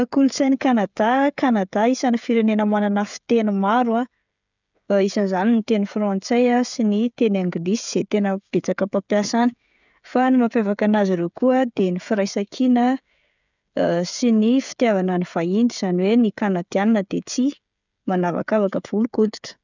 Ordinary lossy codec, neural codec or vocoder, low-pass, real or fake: none; codec, 16 kHz, 16 kbps, FreqCodec, smaller model; 7.2 kHz; fake